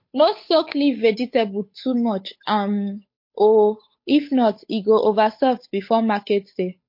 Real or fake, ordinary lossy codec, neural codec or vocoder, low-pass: fake; MP3, 32 kbps; codec, 16 kHz, 8 kbps, FunCodec, trained on Chinese and English, 25 frames a second; 5.4 kHz